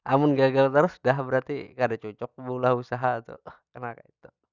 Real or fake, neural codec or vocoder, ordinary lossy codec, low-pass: real; none; Opus, 64 kbps; 7.2 kHz